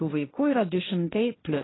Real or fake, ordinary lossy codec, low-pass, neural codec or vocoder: fake; AAC, 16 kbps; 7.2 kHz; codec, 16 kHz, 1.1 kbps, Voila-Tokenizer